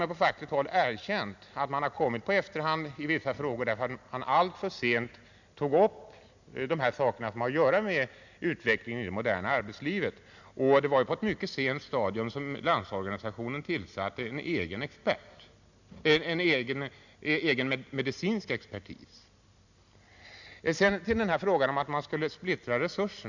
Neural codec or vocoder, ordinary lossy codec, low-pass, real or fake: none; none; 7.2 kHz; real